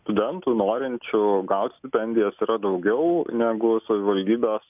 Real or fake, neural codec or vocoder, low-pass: real; none; 3.6 kHz